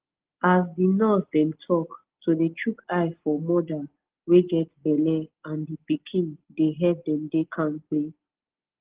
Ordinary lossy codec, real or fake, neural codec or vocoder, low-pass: Opus, 16 kbps; real; none; 3.6 kHz